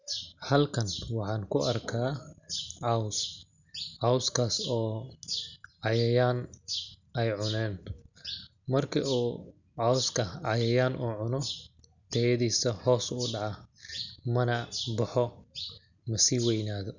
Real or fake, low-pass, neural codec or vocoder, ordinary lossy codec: real; 7.2 kHz; none; none